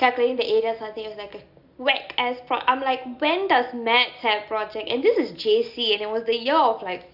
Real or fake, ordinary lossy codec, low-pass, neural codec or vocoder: real; none; 5.4 kHz; none